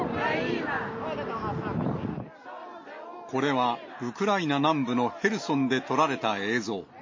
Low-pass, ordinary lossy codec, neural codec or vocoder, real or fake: 7.2 kHz; MP3, 32 kbps; none; real